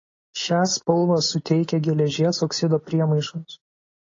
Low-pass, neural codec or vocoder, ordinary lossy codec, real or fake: 7.2 kHz; none; AAC, 32 kbps; real